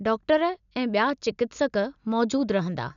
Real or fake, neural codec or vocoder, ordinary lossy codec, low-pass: real; none; none; 7.2 kHz